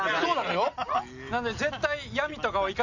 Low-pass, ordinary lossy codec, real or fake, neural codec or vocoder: 7.2 kHz; none; real; none